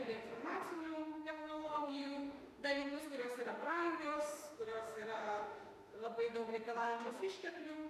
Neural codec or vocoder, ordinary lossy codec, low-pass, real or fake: autoencoder, 48 kHz, 32 numbers a frame, DAC-VAE, trained on Japanese speech; MP3, 96 kbps; 14.4 kHz; fake